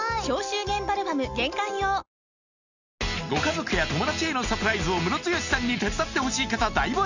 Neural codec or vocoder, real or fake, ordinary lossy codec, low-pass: none; real; none; 7.2 kHz